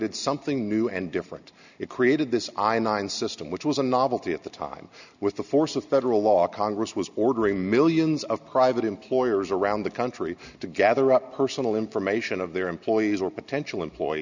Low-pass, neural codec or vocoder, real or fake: 7.2 kHz; none; real